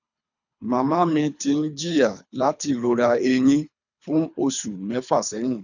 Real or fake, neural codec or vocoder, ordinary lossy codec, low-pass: fake; codec, 24 kHz, 3 kbps, HILCodec; none; 7.2 kHz